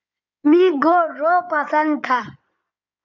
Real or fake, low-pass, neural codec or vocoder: fake; 7.2 kHz; codec, 16 kHz in and 24 kHz out, 2.2 kbps, FireRedTTS-2 codec